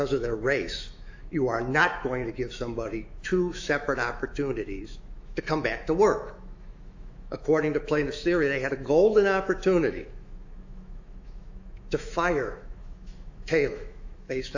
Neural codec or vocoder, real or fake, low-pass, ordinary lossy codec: autoencoder, 48 kHz, 128 numbers a frame, DAC-VAE, trained on Japanese speech; fake; 7.2 kHz; AAC, 48 kbps